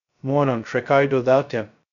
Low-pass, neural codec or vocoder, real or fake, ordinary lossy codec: 7.2 kHz; codec, 16 kHz, 0.2 kbps, FocalCodec; fake; none